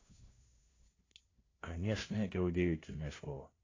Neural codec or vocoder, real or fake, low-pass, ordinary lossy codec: codec, 16 kHz, 0.5 kbps, FunCodec, trained on LibriTTS, 25 frames a second; fake; 7.2 kHz; AAC, 32 kbps